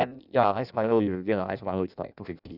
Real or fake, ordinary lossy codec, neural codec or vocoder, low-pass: fake; none; codec, 16 kHz in and 24 kHz out, 0.6 kbps, FireRedTTS-2 codec; 5.4 kHz